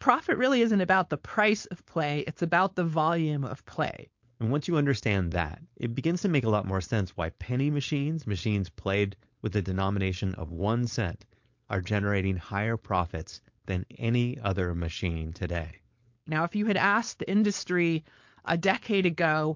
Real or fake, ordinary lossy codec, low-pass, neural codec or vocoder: fake; MP3, 48 kbps; 7.2 kHz; codec, 16 kHz, 4.8 kbps, FACodec